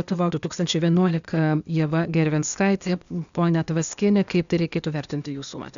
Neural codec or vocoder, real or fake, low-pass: codec, 16 kHz, 0.8 kbps, ZipCodec; fake; 7.2 kHz